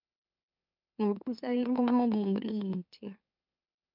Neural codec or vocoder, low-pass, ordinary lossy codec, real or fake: autoencoder, 44.1 kHz, a latent of 192 numbers a frame, MeloTTS; 5.4 kHz; MP3, 48 kbps; fake